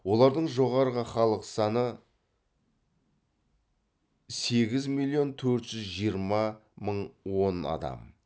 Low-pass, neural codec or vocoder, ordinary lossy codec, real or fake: none; none; none; real